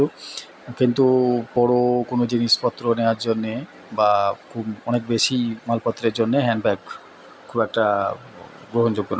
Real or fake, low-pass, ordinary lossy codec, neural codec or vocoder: real; none; none; none